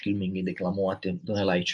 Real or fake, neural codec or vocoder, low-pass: real; none; 10.8 kHz